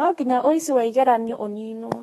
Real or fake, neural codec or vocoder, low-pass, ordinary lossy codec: fake; codec, 32 kHz, 1.9 kbps, SNAC; 14.4 kHz; AAC, 32 kbps